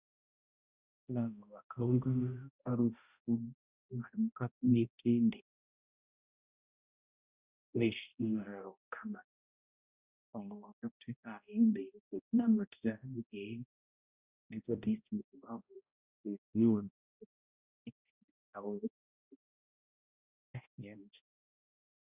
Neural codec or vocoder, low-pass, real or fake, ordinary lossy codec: codec, 16 kHz, 0.5 kbps, X-Codec, HuBERT features, trained on balanced general audio; 3.6 kHz; fake; Opus, 64 kbps